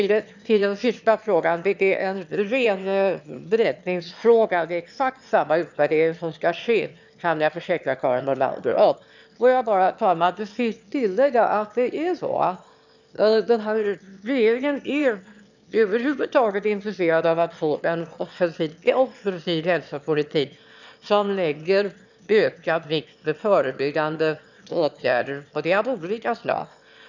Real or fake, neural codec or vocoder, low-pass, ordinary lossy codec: fake; autoencoder, 22.05 kHz, a latent of 192 numbers a frame, VITS, trained on one speaker; 7.2 kHz; none